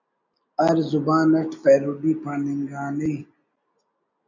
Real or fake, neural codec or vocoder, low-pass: real; none; 7.2 kHz